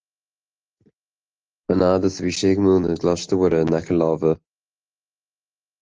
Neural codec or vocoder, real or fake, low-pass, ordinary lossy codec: none; real; 7.2 kHz; Opus, 16 kbps